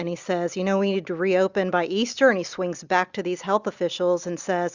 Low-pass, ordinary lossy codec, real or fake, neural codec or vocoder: 7.2 kHz; Opus, 64 kbps; real; none